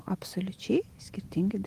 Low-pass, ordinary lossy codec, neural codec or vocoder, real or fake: 14.4 kHz; Opus, 24 kbps; vocoder, 48 kHz, 128 mel bands, Vocos; fake